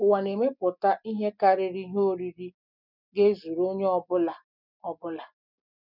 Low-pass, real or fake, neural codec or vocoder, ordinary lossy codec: 5.4 kHz; real; none; none